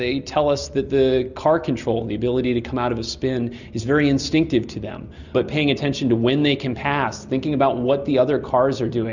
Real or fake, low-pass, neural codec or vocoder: real; 7.2 kHz; none